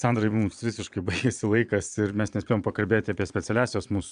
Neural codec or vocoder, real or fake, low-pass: vocoder, 22.05 kHz, 80 mel bands, Vocos; fake; 9.9 kHz